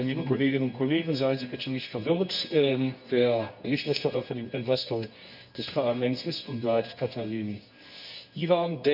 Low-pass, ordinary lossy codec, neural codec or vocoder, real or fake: 5.4 kHz; none; codec, 24 kHz, 0.9 kbps, WavTokenizer, medium music audio release; fake